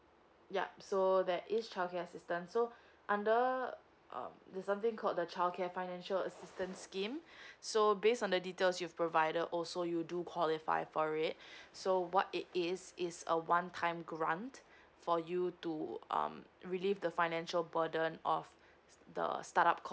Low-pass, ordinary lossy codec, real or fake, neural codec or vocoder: none; none; real; none